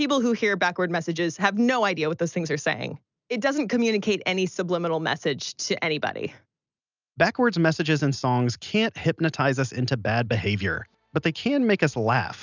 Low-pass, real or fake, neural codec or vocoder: 7.2 kHz; real; none